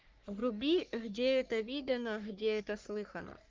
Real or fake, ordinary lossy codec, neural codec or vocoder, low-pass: fake; Opus, 32 kbps; codec, 44.1 kHz, 3.4 kbps, Pupu-Codec; 7.2 kHz